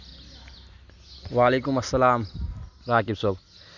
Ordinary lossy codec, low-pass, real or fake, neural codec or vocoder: none; 7.2 kHz; real; none